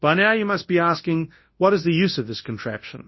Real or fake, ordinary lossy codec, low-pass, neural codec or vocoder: fake; MP3, 24 kbps; 7.2 kHz; codec, 24 kHz, 0.9 kbps, WavTokenizer, large speech release